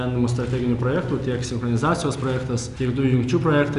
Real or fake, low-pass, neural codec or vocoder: real; 10.8 kHz; none